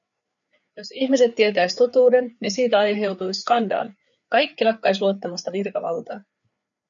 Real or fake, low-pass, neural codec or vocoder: fake; 7.2 kHz; codec, 16 kHz, 4 kbps, FreqCodec, larger model